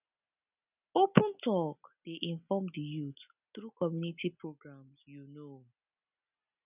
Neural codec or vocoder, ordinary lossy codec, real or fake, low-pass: none; none; real; 3.6 kHz